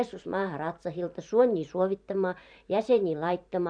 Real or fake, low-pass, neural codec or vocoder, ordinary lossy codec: fake; 9.9 kHz; vocoder, 44.1 kHz, 128 mel bands every 512 samples, BigVGAN v2; none